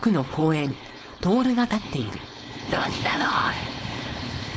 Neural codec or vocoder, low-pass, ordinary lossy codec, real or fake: codec, 16 kHz, 4.8 kbps, FACodec; none; none; fake